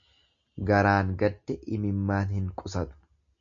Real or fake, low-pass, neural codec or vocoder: real; 7.2 kHz; none